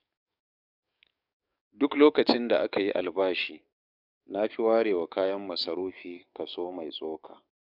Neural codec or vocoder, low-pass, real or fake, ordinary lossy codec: codec, 44.1 kHz, 7.8 kbps, DAC; 5.4 kHz; fake; none